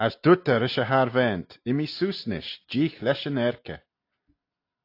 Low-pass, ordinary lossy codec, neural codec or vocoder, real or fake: 5.4 kHz; AAC, 32 kbps; none; real